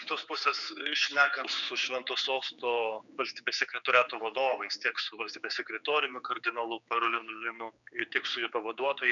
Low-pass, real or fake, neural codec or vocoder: 7.2 kHz; fake; codec, 16 kHz, 4 kbps, X-Codec, HuBERT features, trained on general audio